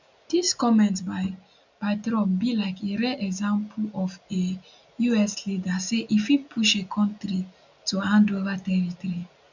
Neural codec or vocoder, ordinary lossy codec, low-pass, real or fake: none; none; 7.2 kHz; real